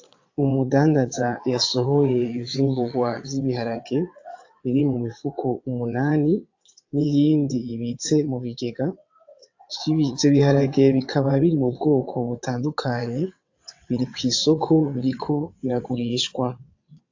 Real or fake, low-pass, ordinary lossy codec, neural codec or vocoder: fake; 7.2 kHz; AAC, 48 kbps; vocoder, 22.05 kHz, 80 mel bands, WaveNeXt